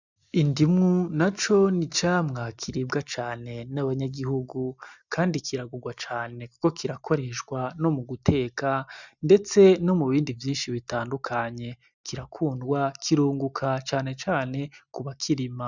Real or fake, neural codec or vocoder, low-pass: real; none; 7.2 kHz